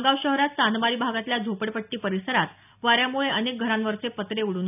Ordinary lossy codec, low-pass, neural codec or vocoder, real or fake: none; 3.6 kHz; none; real